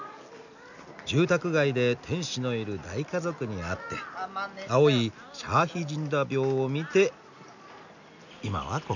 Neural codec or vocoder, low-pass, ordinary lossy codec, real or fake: none; 7.2 kHz; none; real